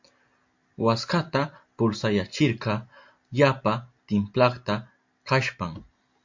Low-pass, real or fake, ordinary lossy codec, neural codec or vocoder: 7.2 kHz; real; MP3, 48 kbps; none